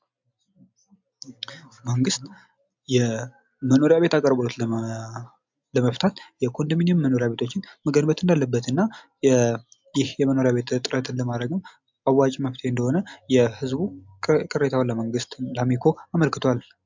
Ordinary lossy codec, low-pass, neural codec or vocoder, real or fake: MP3, 64 kbps; 7.2 kHz; none; real